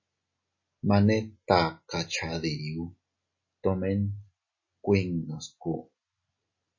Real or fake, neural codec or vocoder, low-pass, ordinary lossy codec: real; none; 7.2 kHz; MP3, 32 kbps